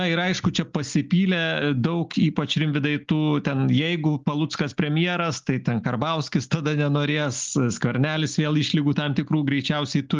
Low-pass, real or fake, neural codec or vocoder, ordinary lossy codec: 7.2 kHz; real; none; Opus, 32 kbps